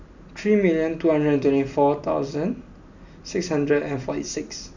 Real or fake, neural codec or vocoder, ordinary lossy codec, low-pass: real; none; none; 7.2 kHz